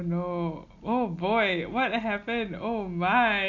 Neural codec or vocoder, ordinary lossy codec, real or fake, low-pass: none; AAC, 48 kbps; real; 7.2 kHz